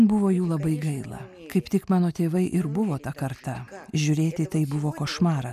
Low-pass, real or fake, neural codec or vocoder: 14.4 kHz; real; none